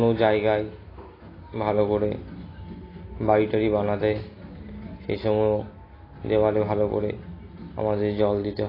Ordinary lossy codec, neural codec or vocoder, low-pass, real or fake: AAC, 24 kbps; none; 5.4 kHz; real